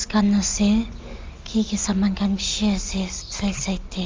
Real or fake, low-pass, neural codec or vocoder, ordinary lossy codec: real; 7.2 kHz; none; Opus, 32 kbps